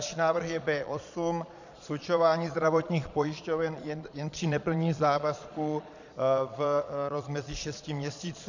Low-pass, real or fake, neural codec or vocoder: 7.2 kHz; fake; vocoder, 22.05 kHz, 80 mel bands, Vocos